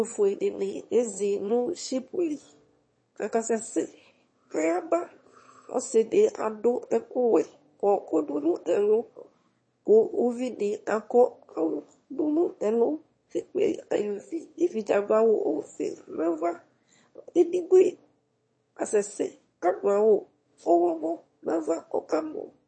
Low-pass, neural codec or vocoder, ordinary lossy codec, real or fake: 9.9 kHz; autoencoder, 22.05 kHz, a latent of 192 numbers a frame, VITS, trained on one speaker; MP3, 32 kbps; fake